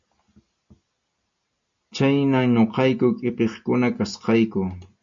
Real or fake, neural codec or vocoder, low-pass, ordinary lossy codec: real; none; 7.2 kHz; MP3, 48 kbps